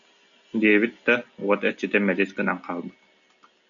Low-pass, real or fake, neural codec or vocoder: 7.2 kHz; real; none